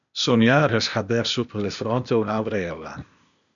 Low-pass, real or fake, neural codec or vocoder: 7.2 kHz; fake; codec, 16 kHz, 0.8 kbps, ZipCodec